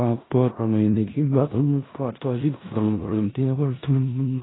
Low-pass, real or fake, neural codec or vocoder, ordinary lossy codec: 7.2 kHz; fake; codec, 16 kHz in and 24 kHz out, 0.4 kbps, LongCat-Audio-Codec, four codebook decoder; AAC, 16 kbps